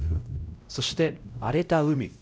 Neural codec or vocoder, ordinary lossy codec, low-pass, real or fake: codec, 16 kHz, 0.5 kbps, X-Codec, WavLM features, trained on Multilingual LibriSpeech; none; none; fake